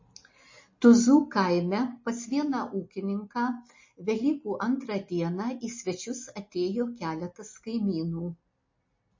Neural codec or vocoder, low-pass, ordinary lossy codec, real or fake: none; 7.2 kHz; MP3, 32 kbps; real